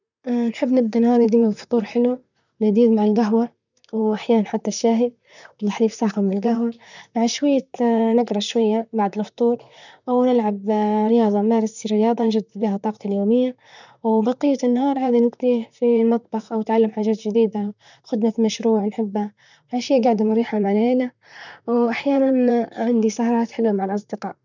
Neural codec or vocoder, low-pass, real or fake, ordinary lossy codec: vocoder, 44.1 kHz, 128 mel bands, Pupu-Vocoder; 7.2 kHz; fake; none